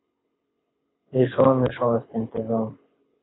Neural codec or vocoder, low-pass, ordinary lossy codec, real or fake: codec, 24 kHz, 6 kbps, HILCodec; 7.2 kHz; AAC, 16 kbps; fake